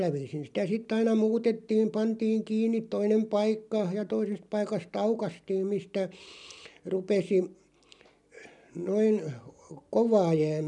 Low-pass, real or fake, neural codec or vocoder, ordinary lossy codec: 10.8 kHz; real; none; none